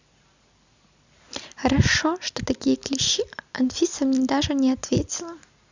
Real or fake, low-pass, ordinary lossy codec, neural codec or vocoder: real; 7.2 kHz; Opus, 64 kbps; none